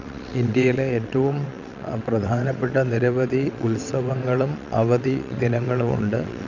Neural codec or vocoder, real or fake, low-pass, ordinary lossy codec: vocoder, 22.05 kHz, 80 mel bands, WaveNeXt; fake; 7.2 kHz; none